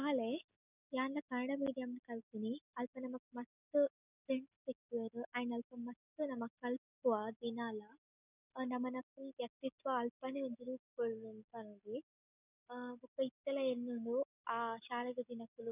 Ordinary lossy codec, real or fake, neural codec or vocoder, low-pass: none; real; none; 3.6 kHz